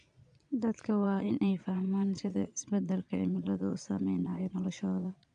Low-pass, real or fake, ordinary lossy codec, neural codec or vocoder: 9.9 kHz; fake; none; vocoder, 22.05 kHz, 80 mel bands, Vocos